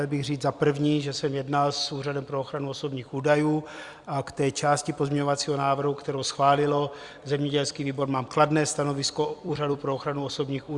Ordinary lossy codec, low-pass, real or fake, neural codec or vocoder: Opus, 64 kbps; 10.8 kHz; fake; vocoder, 44.1 kHz, 128 mel bands every 256 samples, BigVGAN v2